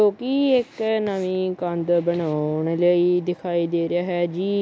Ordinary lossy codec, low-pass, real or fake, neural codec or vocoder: none; none; real; none